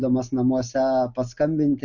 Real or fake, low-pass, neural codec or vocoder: real; 7.2 kHz; none